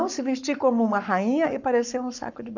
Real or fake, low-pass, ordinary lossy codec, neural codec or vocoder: fake; 7.2 kHz; none; codec, 44.1 kHz, 7.8 kbps, Pupu-Codec